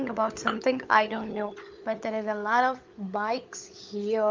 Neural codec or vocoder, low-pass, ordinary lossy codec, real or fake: codec, 16 kHz, 16 kbps, FunCodec, trained on LibriTTS, 50 frames a second; 7.2 kHz; Opus, 32 kbps; fake